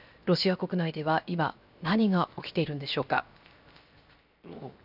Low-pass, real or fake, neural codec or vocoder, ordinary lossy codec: 5.4 kHz; fake; codec, 16 kHz, 0.7 kbps, FocalCodec; none